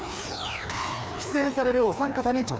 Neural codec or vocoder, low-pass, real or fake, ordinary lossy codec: codec, 16 kHz, 2 kbps, FreqCodec, larger model; none; fake; none